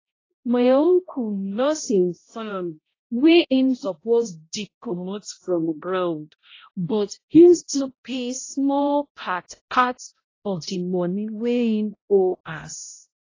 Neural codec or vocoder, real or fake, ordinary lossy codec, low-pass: codec, 16 kHz, 0.5 kbps, X-Codec, HuBERT features, trained on balanced general audio; fake; AAC, 32 kbps; 7.2 kHz